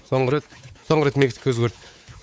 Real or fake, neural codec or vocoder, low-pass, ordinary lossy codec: fake; codec, 16 kHz, 4 kbps, X-Codec, WavLM features, trained on Multilingual LibriSpeech; none; none